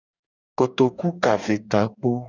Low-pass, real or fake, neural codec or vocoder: 7.2 kHz; fake; codec, 44.1 kHz, 2.6 kbps, DAC